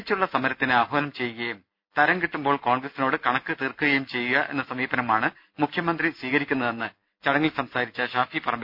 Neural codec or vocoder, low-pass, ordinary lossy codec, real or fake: none; 5.4 kHz; none; real